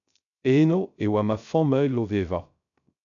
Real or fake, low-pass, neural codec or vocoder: fake; 7.2 kHz; codec, 16 kHz, 0.3 kbps, FocalCodec